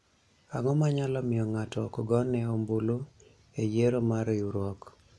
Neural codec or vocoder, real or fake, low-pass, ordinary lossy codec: none; real; none; none